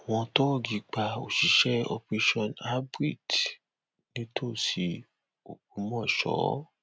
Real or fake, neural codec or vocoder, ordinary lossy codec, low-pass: real; none; none; none